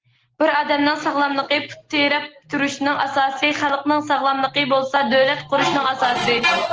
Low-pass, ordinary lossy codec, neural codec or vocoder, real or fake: 7.2 kHz; Opus, 16 kbps; none; real